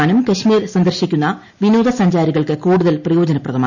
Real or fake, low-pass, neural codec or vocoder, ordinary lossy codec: real; 7.2 kHz; none; none